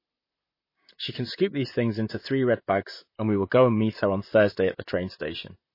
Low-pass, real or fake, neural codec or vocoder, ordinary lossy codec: 5.4 kHz; fake; vocoder, 44.1 kHz, 128 mel bands, Pupu-Vocoder; MP3, 24 kbps